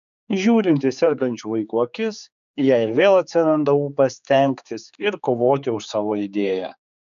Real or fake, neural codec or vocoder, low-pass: fake; codec, 16 kHz, 4 kbps, X-Codec, HuBERT features, trained on general audio; 7.2 kHz